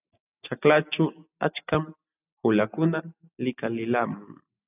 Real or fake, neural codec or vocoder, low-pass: real; none; 3.6 kHz